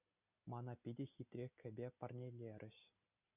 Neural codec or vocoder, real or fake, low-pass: none; real; 3.6 kHz